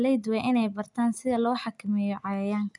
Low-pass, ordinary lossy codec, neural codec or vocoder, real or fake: 10.8 kHz; none; none; real